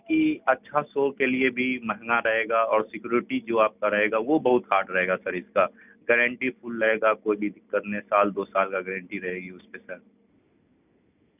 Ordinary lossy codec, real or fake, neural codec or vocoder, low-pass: none; real; none; 3.6 kHz